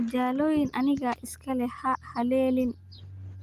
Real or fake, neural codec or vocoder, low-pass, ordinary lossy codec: real; none; 14.4 kHz; Opus, 32 kbps